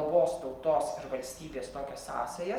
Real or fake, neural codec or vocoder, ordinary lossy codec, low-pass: real; none; Opus, 32 kbps; 19.8 kHz